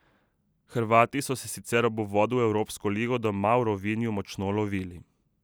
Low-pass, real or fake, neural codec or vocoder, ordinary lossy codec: none; real; none; none